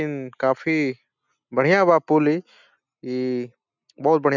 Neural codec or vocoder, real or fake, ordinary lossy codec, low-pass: none; real; none; 7.2 kHz